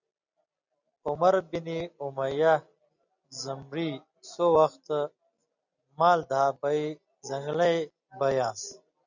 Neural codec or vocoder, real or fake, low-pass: none; real; 7.2 kHz